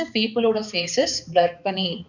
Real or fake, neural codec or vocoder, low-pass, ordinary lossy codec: fake; codec, 16 kHz, 4 kbps, X-Codec, HuBERT features, trained on balanced general audio; 7.2 kHz; none